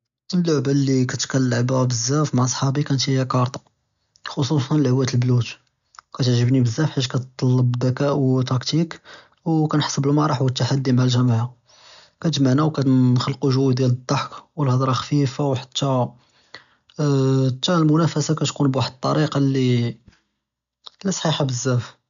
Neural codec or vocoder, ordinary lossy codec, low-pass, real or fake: none; none; 7.2 kHz; real